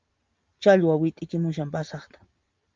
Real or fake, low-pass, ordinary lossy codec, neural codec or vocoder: real; 7.2 kHz; Opus, 32 kbps; none